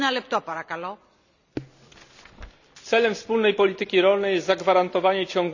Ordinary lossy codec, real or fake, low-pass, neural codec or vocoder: none; real; 7.2 kHz; none